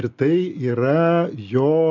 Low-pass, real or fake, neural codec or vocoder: 7.2 kHz; real; none